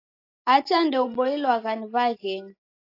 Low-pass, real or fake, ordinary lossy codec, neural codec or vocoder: 5.4 kHz; real; AAC, 32 kbps; none